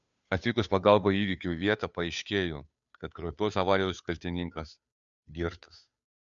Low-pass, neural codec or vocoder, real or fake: 7.2 kHz; codec, 16 kHz, 2 kbps, FunCodec, trained on Chinese and English, 25 frames a second; fake